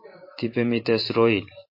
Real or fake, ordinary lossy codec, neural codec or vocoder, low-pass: fake; MP3, 32 kbps; vocoder, 44.1 kHz, 128 mel bands every 512 samples, BigVGAN v2; 5.4 kHz